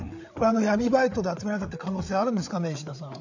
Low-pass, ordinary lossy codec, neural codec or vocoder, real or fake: 7.2 kHz; none; codec, 16 kHz, 8 kbps, FreqCodec, larger model; fake